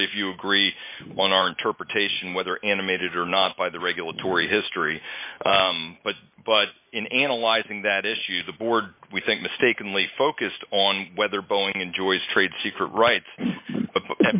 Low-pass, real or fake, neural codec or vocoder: 3.6 kHz; real; none